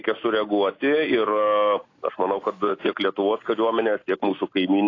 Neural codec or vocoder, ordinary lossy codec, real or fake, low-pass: none; AAC, 32 kbps; real; 7.2 kHz